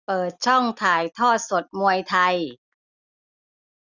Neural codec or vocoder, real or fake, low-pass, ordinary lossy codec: none; real; 7.2 kHz; none